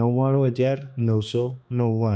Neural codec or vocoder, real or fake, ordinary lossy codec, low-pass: codec, 16 kHz, 2 kbps, X-Codec, HuBERT features, trained on balanced general audio; fake; none; none